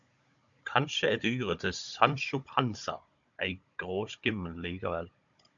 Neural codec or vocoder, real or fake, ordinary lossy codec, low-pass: codec, 16 kHz, 16 kbps, FunCodec, trained on Chinese and English, 50 frames a second; fake; MP3, 64 kbps; 7.2 kHz